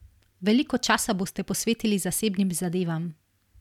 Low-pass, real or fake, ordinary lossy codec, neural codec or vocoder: 19.8 kHz; real; none; none